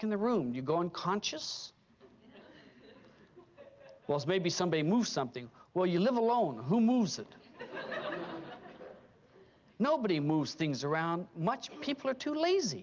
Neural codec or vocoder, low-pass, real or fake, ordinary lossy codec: none; 7.2 kHz; real; Opus, 32 kbps